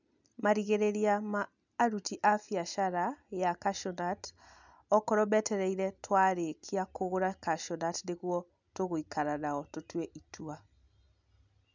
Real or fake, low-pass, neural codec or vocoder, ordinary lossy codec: real; 7.2 kHz; none; none